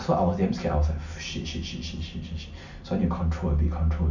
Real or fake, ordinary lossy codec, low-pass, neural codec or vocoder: real; none; 7.2 kHz; none